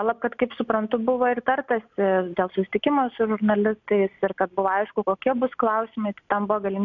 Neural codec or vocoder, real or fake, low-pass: none; real; 7.2 kHz